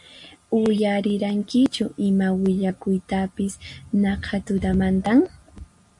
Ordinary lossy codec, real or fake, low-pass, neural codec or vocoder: MP3, 64 kbps; real; 10.8 kHz; none